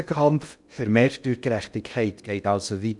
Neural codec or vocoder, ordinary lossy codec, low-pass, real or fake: codec, 16 kHz in and 24 kHz out, 0.6 kbps, FocalCodec, streaming, 2048 codes; none; 10.8 kHz; fake